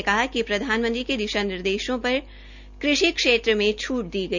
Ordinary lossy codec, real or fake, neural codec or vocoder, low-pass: none; real; none; 7.2 kHz